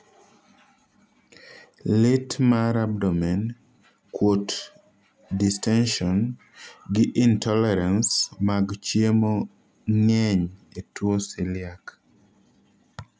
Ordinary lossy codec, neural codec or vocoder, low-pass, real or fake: none; none; none; real